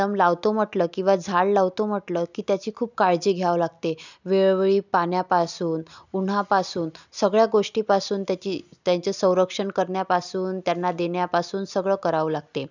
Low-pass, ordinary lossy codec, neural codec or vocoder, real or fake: 7.2 kHz; none; none; real